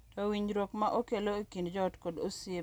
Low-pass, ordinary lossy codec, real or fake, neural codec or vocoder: none; none; real; none